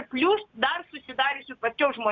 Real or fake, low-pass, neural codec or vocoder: real; 7.2 kHz; none